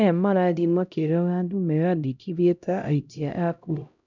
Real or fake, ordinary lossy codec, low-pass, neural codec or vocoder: fake; none; 7.2 kHz; codec, 16 kHz, 0.5 kbps, X-Codec, HuBERT features, trained on LibriSpeech